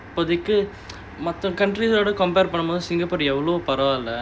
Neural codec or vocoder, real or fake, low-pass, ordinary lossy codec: none; real; none; none